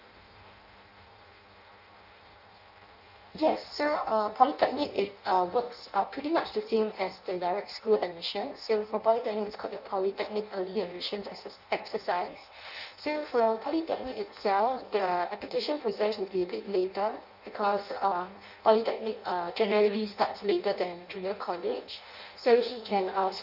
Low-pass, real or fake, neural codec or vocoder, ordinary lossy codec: 5.4 kHz; fake; codec, 16 kHz in and 24 kHz out, 0.6 kbps, FireRedTTS-2 codec; none